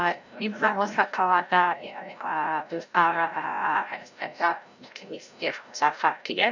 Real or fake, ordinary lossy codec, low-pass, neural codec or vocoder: fake; none; 7.2 kHz; codec, 16 kHz, 0.5 kbps, FreqCodec, larger model